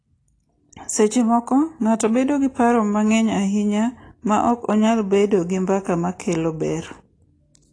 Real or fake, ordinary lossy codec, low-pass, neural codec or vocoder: real; AAC, 32 kbps; 9.9 kHz; none